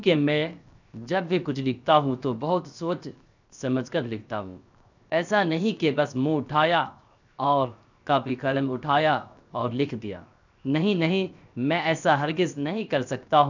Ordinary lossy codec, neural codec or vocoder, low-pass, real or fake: none; codec, 16 kHz, 0.7 kbps, FocalCodec; 7.2 kHz; fake